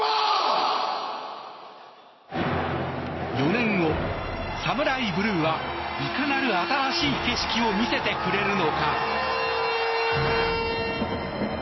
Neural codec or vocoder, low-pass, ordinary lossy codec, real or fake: none; 7.2 kHz; MP3, 24 kbps; real